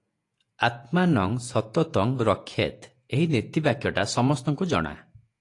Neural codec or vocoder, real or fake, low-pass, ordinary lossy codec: vocoder, 48 kHz, 128 mel bands, Vocos; fake; 10.8 kHz; AAC, 48 kbps